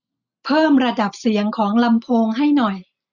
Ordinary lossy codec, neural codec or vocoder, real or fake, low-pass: none; none; real; 7.2 kHz